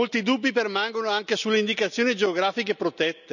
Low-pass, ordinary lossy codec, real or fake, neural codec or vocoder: 7.2 kHz; none; real; none